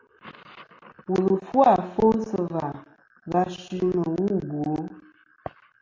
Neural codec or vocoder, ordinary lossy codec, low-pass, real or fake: none; AAC, 48 kbps; 7.2 kHz; real